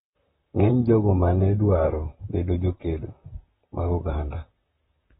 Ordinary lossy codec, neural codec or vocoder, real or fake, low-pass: AAC, 16 kbps; vocoder, 44.1 kHz, 128 mel bands, Pupu-Vocoder; fake; 19.8 kHz